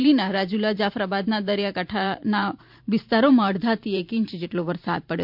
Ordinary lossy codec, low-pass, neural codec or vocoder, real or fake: MP3, 48 kbps; 5.4 kHz; vocoder, 44.1 kHz, 128 mel bands every 512 samples, BigVGAN v2; fake